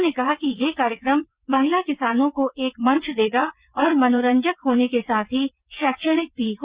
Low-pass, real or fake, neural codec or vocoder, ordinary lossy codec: 3.6 kHz; fake; vocoder, 22.05 kHz, 80 mel bands, WaveNeXt; Opus, 64 kbps